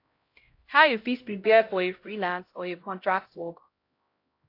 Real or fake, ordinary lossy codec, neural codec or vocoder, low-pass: fake; AAC, 32 kbps; codec, 16 kHz, 0.5 kbps, X-Codec, HuBERT features, trained on LibriSpeech; 5.4 kHz